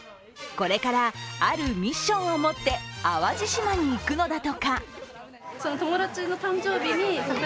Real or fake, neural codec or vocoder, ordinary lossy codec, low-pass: real; none; none; none